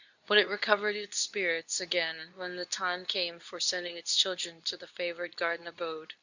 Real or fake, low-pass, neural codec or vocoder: fake; 7.2 kHz; codec, 24 kHz, 0.9 kbps, WavTokenizer, medium speech release version 2